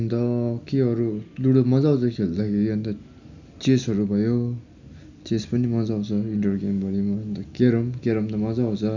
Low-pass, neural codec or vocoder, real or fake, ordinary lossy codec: 7.2 kHz; none; real; none